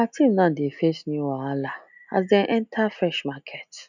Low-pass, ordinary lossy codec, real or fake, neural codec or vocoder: 7.2 kHz; none; real; none